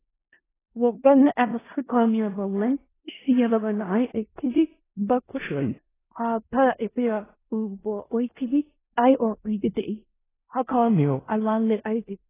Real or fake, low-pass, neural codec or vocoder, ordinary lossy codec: fake; 3.6 kHz; codec, 16 kHz in and 24 kHz out, 0.4 kbps, LongCat-Audio-Codec, four codebook decoder; AAC, 16 kbps